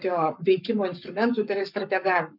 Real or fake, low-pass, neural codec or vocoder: fake; 5.4 kHz; codec, 44.1 kHz, 7.8 kbps, Pupu-Codec